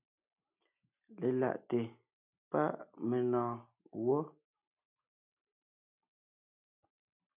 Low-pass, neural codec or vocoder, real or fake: 3.6 kHz; none; real